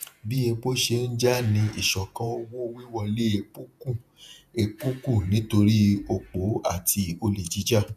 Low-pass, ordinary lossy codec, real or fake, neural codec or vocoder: 14.4 kHz; none; fake; vocoder, 48 kHz, 128 mel bands, Vocos